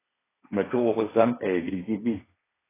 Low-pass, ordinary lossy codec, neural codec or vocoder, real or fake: 3.6 kHz; AAC, 16 kbps; codec, 16 kHz, 1.1 kbps, Voila-Tokenizer; fake